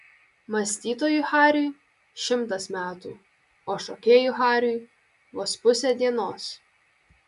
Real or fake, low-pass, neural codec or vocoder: real; 10.8 kHz; none